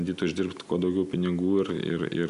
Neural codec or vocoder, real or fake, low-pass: none; real; 10.8 kHz